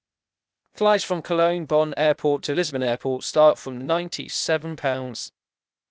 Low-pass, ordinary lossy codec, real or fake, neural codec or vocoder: none; none; fake; codec, 16 kHz, 0.8 kbps, ZipCodec